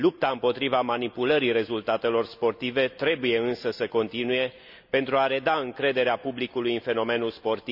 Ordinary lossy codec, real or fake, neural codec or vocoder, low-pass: none; real; none; 5.4 kHz